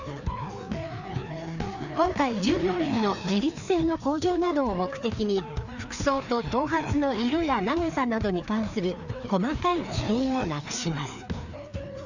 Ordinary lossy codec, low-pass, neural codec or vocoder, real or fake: none; 7.2 kHz; codec, 16 kHz, 2 kbps, FreqCodec, larger model; fake